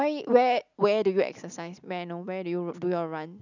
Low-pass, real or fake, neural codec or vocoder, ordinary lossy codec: 7.2 kHz; real; none; none